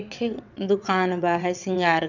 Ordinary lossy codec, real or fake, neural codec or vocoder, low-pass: none; fake; vocoder, 22.05 kHz, 80 mel bands, WaveNeXt; 7.2 kHz